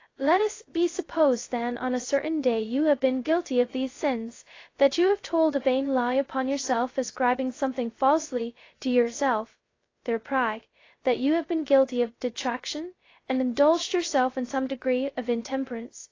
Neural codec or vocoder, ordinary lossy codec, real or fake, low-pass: codec, 16 kHz, 0.2 kbps, FocalCodec; AAC, 32 kbps; fake; 7.2 kHz